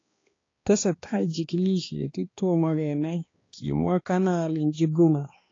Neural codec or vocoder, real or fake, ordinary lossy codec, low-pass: codec, 16 kHz, 2 kbps, X-Codec, HuBERT features, trained on balanced general audio; fake; AAC, 32 kbps; 7.2 kHz